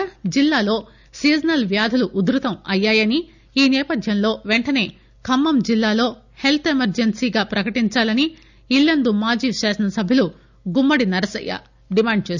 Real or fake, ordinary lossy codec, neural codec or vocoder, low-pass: real; none; none; 7.2 kHz